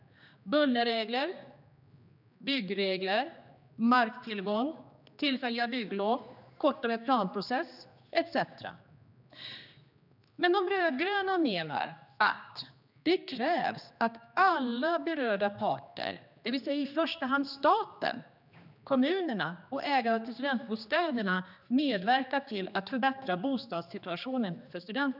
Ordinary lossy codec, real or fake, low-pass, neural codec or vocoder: none; fake; 5.4 kHz; codec, 16 kHz, 2 kbps, X-Codec, HuBERT features, trained on general audio